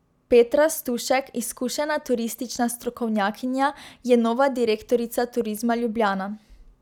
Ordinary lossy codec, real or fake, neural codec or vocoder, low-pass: none; real; none; 19.8 kHz